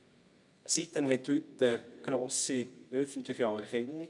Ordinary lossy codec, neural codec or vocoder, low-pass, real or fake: none; codec, 24 kHz, 0.9 kbps, WavTokenizer, medium music audio release; 10.8 kHz; fake